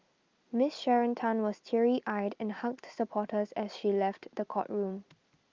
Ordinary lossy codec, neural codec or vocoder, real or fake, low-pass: Opus, 32 kbps; none; real; 7.2 kHz